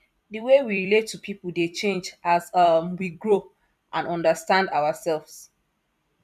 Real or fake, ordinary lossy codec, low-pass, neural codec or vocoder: fake; none; 14.4 kHz; vocoder, 44.1 kHz, 128 mel bands every 256 samples, BigVGAN v2